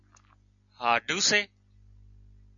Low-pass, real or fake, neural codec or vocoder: 7.2 kHz; real; none